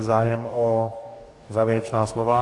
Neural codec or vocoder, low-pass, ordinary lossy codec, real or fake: codec, 44.1 kHz, 2.6 kbps, DAC; 10.8 kHz; AAC, 48 kbps; fake